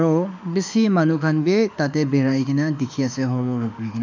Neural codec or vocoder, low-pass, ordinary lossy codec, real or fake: autoencoder, 48 kHz, 32 numbers a frame, DAC-VAE, trained on Japanese speech; 7.2 kHz; MP3, 64 kbps; fake